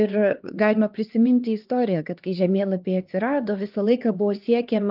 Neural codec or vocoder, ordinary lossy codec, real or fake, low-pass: codec, 16 kHz, 2 kbps, X-Codec, HuBERT features, trained on LibriSpeech; Opus, 32 kbps; fake; 5.4 kHz